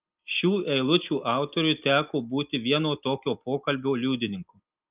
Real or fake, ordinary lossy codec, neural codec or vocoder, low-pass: real; Opus, 24 kbps; none; 3.6 kHz